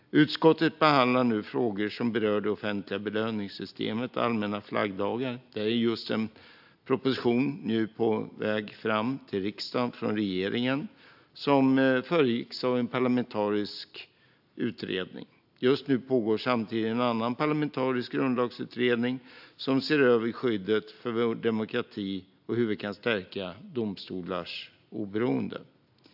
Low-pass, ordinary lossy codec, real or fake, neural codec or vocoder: 5.4 kHz; AAC, 48 kbps; real; none